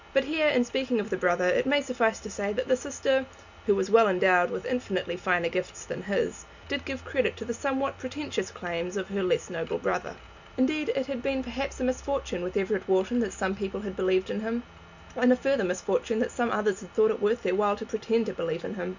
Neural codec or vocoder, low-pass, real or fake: none; 7.2 kHz; real